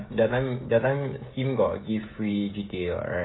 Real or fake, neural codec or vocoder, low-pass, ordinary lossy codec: fake; codec, 16 kHz, 16 kbps, FreqCodec, smaller model; 7.2 kHz; AAC, 16 kbps